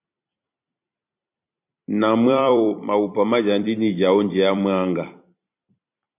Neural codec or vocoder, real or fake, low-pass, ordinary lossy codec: vocoder, 44.1 kHz, 128 mel bands every 256 samples, BigVGAN v2; fake; 3.6 kHz; MP3, 32 kbps